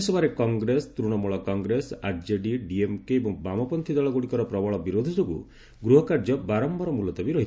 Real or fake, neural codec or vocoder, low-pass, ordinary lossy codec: real; none; none; none